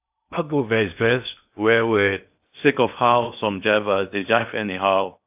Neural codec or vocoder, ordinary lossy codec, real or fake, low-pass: codec, 16 kHz in and 24 kHz out, 0.6 kbps, FocalCodec, streaming, 2048 codes; AAC, 32 kbps; fake; 3.6 kHz